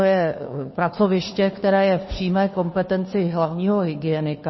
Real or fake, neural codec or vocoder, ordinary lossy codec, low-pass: fake; codec, 16 kHz, 2 kbps, FunCodec, trained on Chinese and English, 25 frames a second; MP3, 24 kbps; 7.2 kHz